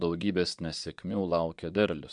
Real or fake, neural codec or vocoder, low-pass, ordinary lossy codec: fake; vocoder, 22.05 kHz, 80 mel bands, Vocos; 9.9 kHz; MP3, 64 kbps